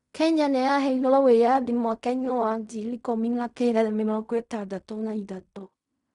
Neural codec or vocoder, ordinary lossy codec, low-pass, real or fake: codec, 16 kHz in and 24 kHz out, 0.4 kbps, LongCat-Audio-Codec, fine tuned four codebook decoder; none; 10.8 kHz; fake